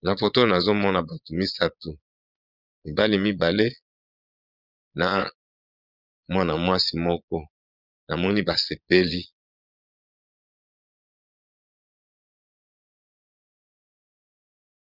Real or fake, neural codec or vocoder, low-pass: fake; vocoder, 22.05 kHz, 80 mel bands, Vocos; 5.4 kHz